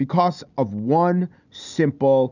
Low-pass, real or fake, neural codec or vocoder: 7.2 kHz; real; none